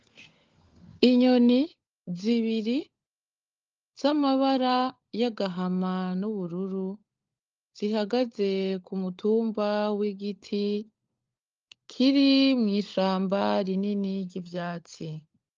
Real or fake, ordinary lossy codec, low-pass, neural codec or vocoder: fake; Opus, 24 kbps; 7.2 kHz; codec, 16 kHz, 16 kbps, FunCodec, trained on LibriTTS, 50 frames a second